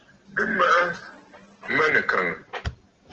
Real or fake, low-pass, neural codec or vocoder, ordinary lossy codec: real; 7.2 kHz; none; Opus, 16 kbps